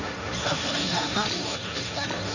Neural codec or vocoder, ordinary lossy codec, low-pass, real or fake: codec, 16 kHz, 1.1 kbps, Voila-Tokenizer; none; 7.2 kHz; fake